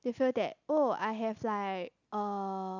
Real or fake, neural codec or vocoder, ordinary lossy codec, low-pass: real; none; none; 7.2 kHz